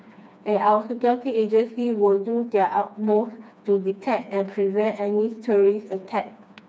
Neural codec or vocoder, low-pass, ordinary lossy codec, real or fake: codec, 16 kHz, 2 kbps, FreqCodec, smaller model; none; none; fake